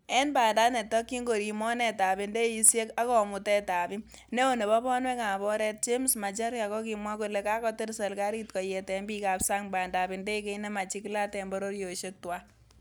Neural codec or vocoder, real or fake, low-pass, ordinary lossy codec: none; real; none; none